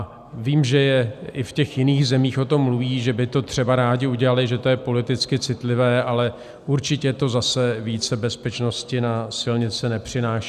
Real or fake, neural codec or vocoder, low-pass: real; none; 14.4 kHz